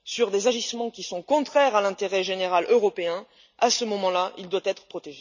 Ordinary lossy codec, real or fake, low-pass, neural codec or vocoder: none; real; 7.2 kHz; none